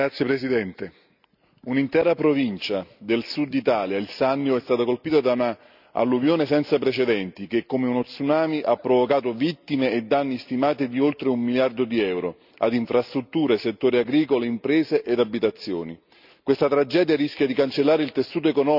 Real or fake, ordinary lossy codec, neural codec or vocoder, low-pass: real; none; none; 5.4 kHz